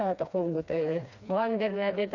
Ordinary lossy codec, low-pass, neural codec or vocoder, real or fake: none; 7.2 kHz; codec, 16 kHz, 2 kbps, FreqCodec, smaller model; fake